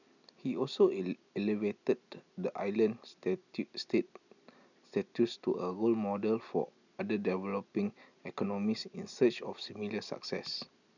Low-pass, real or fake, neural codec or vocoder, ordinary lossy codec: 7.2 kHz; real; none; none